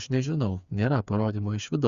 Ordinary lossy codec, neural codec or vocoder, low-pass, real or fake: Opus, 64 kbps; codec, 16 kHz, 4 kbps, FreqCodec, smaller model; 7.2 kHz; fake